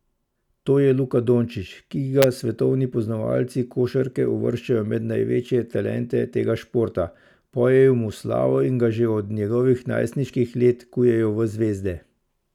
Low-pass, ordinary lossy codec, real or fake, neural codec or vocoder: 19.8 kHz; none; real; none